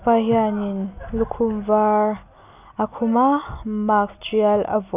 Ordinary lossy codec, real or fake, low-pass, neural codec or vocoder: none; real; 3.6 kHz; none